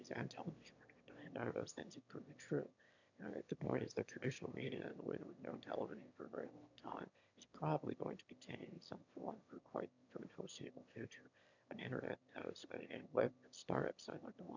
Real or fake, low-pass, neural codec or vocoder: fake; 7.2 kHz; autoencoder, 22.05 kHz, a latent of 192 numbers a frame, VITS, trained on one speaker